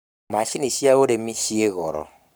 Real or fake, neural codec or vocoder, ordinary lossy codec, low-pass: fake; codec, 44.1 kHz, 7.8 kbps, Pupu-Codec; none; none